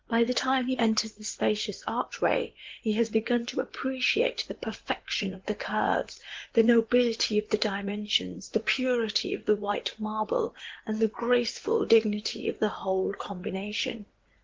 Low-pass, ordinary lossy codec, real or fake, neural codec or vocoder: 7.2 kHz; Opus, 16 kbps; fake; codec, 16 kHz in and 24 kHz out, 2.2 kbps, FireRedTTS-2 codec